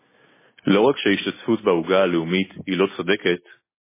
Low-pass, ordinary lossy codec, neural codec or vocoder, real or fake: 3.6 kHz; MP3, 16 kbps; none; real